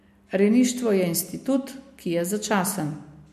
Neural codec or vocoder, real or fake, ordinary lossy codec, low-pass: none; real; MP3, 64 kbps; 14.4 kHz